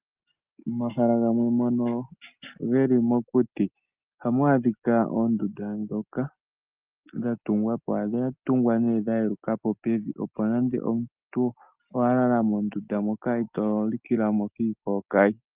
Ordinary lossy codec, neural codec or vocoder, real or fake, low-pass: Opus, 32 kbps; none; real; 3.6 kHz